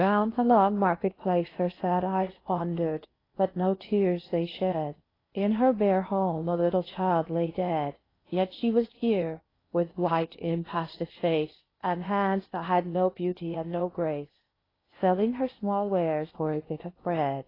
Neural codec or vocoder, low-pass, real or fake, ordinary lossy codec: codec, 16 kHz in and 24 kHz out, 0.8 kbps, FocalCodec, streaming, 65536 codes; 5.4 kHz; fake; AAC, 24 kbps